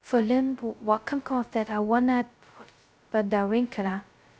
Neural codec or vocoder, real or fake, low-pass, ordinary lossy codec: codec, 16 kHz, 0.2 kbps, FocalCodec; fake; none; none